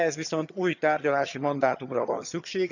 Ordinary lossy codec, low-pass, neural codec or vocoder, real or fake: none; 7.2 kHz; vocoder, 22.05 kHz, 80 mel bands, HiFi-GAN; fake